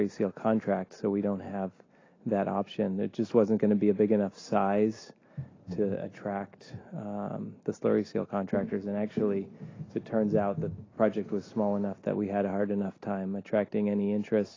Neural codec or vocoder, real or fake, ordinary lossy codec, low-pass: none; real; AAC, 32 kbps; 7.2 kHz